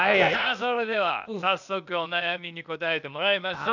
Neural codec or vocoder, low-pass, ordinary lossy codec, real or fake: codec, 16 kHz, 0.8 kbps, ZipCodec; 7.2 kHz; none; fake